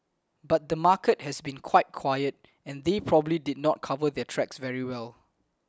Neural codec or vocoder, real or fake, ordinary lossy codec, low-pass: none; real; none; none